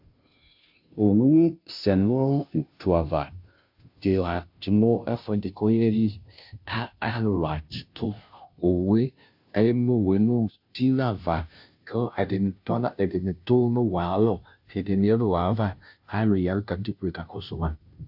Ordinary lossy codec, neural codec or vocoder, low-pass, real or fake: AAC, 48 kbps; codec, 16 kHz, 0.5 kbps, FunCodec, trained on Chinese and English, 25 frames a second; 5.4 kHz; fake